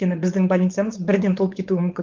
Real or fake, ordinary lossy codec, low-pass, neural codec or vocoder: fake; Opus, 32 kbps; 7.2 kHz; codec, 16 kHz, 4.8 kbps, FACodec